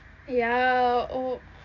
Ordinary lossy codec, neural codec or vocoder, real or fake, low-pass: none; none; real; 7.2 kHz